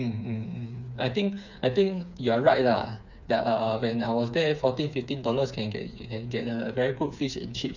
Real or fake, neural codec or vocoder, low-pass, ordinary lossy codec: fake; codec, 16 kHz, 4 kbps, FreqCodec, smaller model; 7.2 kHz; none